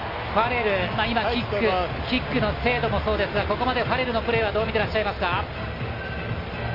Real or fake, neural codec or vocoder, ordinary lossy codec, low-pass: real; none; MP3, 32 kbps; 5.4 kHz